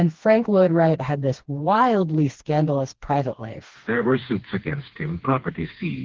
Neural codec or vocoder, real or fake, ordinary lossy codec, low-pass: codec, 16 kHz, 2 kbps, FreqCodec, smaller model; fake; Opus, 16 kbps; 7.2 kHz